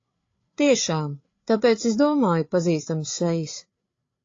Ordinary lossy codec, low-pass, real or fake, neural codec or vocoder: AAC, 32 kbps; 7.2 kHz; fake; codec, 16 kHz, 8 kbps, FreqCodec, larger model